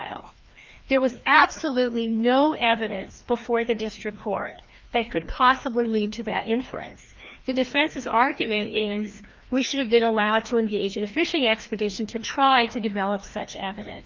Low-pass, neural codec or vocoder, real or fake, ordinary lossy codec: 7.2 kHz; codec, 16 kHz, 1 kbps, FreqCodec, larger model; fake; Opus, 24 kbps